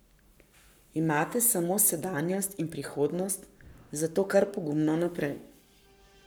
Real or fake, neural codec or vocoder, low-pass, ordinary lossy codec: fake; codec, 44.1 kHz, 7.8 kbps, Pupu-Codec; none; none